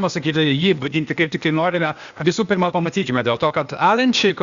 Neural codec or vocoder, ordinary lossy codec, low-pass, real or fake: codec, 16 kHz, 0.8 kbps, ZipCodec; Opus, 64 kbps; 7.2 kHz; fake